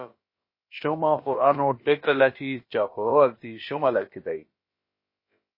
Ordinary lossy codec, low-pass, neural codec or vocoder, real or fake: MP3, 24 kbps; 5.4 kHz; codec, 16 kHz, about 1 kbps, DyCAST, with the encoder's durations; fake